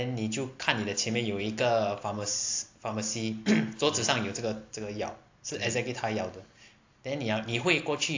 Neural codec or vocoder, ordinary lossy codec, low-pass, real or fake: none; AAC, 48 kbps; 7.2 kHz; real